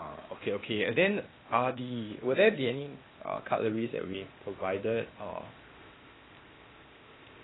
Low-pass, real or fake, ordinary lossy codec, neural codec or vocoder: 7.2 kHz; fake; AAC, 16 kbps; codec, 16 kHz, 0.8 kbps, ZipCodec